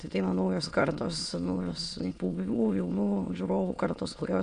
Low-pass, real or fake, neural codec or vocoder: 9.9 kHz; fake; autoencoder, 22.05 kHz, a latent of 192 numbers a frame, VITS, trained on many speakers